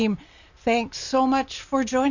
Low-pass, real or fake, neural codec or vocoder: 7.2 kHz; real; none